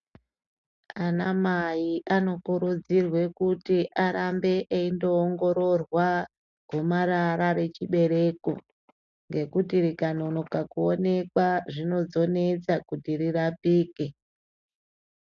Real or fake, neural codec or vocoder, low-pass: real; none; 7.2 kHz